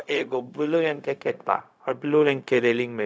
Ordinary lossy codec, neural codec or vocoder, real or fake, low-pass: none; codec, 16 kHz, 0.4 kbps, LongCat-Audio-Codec; fake; none